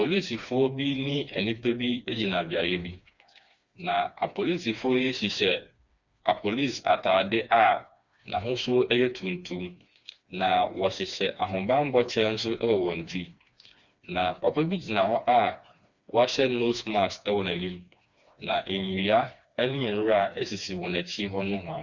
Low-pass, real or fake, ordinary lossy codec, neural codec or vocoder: 7.2 kHz; fake; Opus, 64 kbps; codec, 16 kHz, 2 kbps, FreqCodec, smaller model